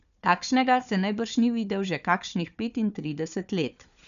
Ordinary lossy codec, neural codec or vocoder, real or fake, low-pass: none; codec, 16 kHz, 4 kbps, FunCodec, trained on Chinese and English, 50 frames a second; fake; 7.2 kHz